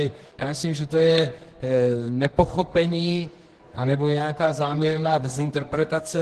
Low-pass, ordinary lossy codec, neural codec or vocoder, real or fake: 10.8 kHz; Opus, 16 kbps; codec, 24 kHz, 0.9 kbps, WavTokenizer, medium music audio release; fake